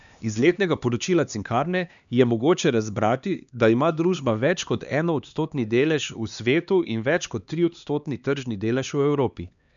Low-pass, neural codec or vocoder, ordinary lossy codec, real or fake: 7.2 kHz; codec, 16 kHz, 2 kbps, X-Codec, HuBERT features, trained on LibriSpeech; none; fake